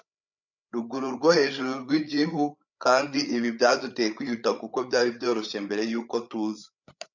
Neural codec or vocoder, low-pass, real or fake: codec, 16 kHz, 8 kbps, FreqCodec, larger model; 7.2 kHz; fake